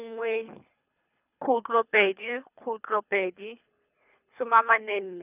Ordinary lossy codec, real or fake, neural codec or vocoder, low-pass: none; fake; codec, 24 kHz, 3 kbps, HILCodec; 3.6 kHz